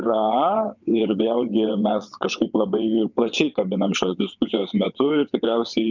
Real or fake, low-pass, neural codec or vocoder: fake; 7.2 kHz; vocoder, 22.05 kHz, 80 mel bands, WaveNeXt